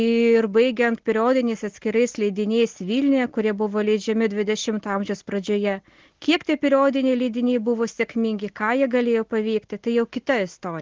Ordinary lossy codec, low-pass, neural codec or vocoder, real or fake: Opus, 16 kbps; 7.2 kHz; none; real